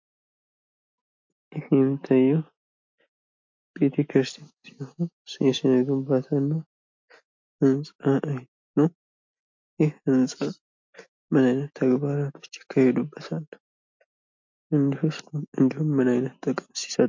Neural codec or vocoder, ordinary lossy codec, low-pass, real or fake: none; MP3, 48 kbps; 7.2 kHz; real